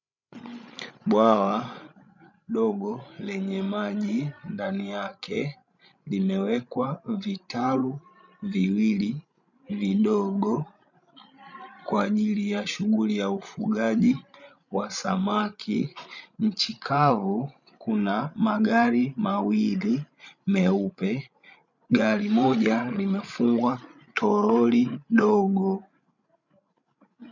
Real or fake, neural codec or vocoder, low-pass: fake; codec, 16 kHz, 16 kbps, FreqCodec, larger model; 7.2 kHz